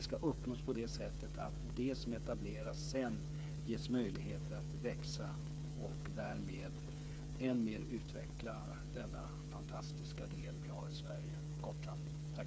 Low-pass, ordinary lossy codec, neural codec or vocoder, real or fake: none; none; codec, 16 kHz, 8 kbps, FreqCodec, smaller model; fake